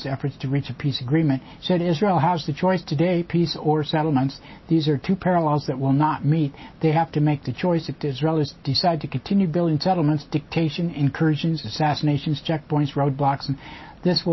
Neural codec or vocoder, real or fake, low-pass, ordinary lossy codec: none; real; 7.2 kHz; MP3, 24 kbps